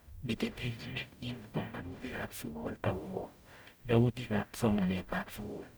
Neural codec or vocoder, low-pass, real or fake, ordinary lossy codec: codec, 44.1 kHz, 0.9 kbps, DAC; none; fake; none